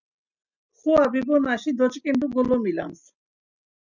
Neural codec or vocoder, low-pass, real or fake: none; 7.2 kHz; real